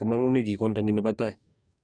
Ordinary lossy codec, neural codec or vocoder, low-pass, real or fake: none; codec, 44.1 kHz, 2.6 kbps, DAC; 9.9 kHz; fake